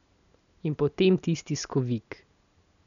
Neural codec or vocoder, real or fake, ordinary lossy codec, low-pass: none; real; none; 7.2 kHz